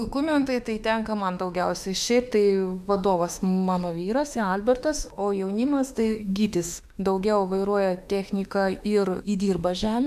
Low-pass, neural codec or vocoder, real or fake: 14.4 kHz; autoencoder, 48 kHz, 32 numbers a frame, DAC-VAE, trained on Japanese speech; fake